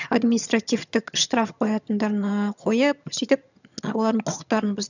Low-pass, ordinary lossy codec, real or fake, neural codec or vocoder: 7.2 kHz; none; fake; vocoder, 22.05 kHz, 80 mel bands, HiFi-GAN